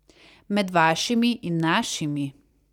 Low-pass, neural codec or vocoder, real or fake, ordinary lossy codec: 19.8 kHz; vocoder, 44.1 kHz, 128 mel bands every 256 samples, BigVGAN v2; fake; none